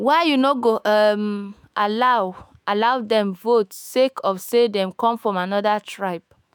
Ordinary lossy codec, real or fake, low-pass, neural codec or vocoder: none; fake; none; autoencoder, 48 kHz, 128 numbers a frame, DAC-VAE, trained on Japanese speech